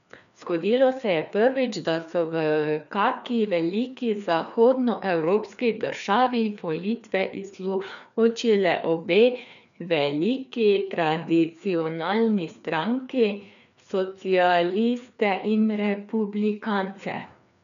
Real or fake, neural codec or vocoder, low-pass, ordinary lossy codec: fake; codec, 16 kHz, 2 kbps, FreqCodec, larger model; 7.2 kHz; none